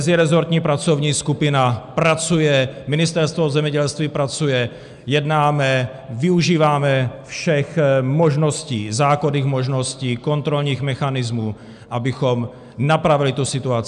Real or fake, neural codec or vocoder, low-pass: real; none; 10.8 kHz